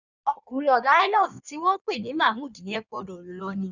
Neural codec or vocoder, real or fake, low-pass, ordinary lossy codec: codec, 16 kHz in and 24 kHz out, 1.1 kbps, FireRedTTS-2 codec; fake; 7.2 kHz; none